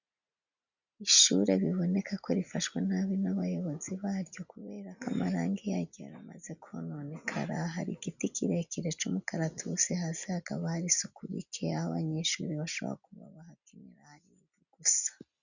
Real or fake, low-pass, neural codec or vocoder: real; 7.2 kHz; none